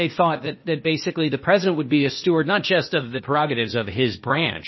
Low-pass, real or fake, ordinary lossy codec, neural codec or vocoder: 7.2 kHz; fake; MP3, 24 kbps; codec, 16 kHz, 0.8 kbps, ZipCodec